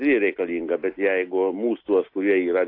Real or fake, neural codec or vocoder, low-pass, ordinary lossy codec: real; none; 5.4 kHz; AAC, 32 kbps